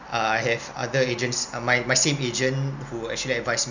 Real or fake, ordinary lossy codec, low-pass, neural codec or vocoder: real; none; 7.2 kHz; none